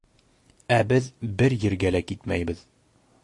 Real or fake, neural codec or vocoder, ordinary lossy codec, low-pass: real; none; AAC, 48 kbps; 10.8 kHz